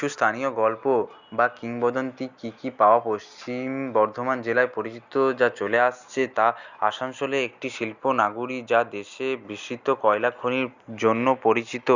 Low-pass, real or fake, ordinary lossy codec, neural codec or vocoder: 7.2 kHz; real; Opus, 64 kbps; none